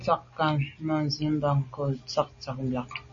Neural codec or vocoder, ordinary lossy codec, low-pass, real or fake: none; MP3, 32 kbps; 7.2 kHz; real